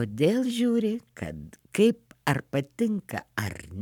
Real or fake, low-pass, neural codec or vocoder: fake; 19.8 kHz; codec, 44.1 kHz, 7.8 kbps, Pupu-Codec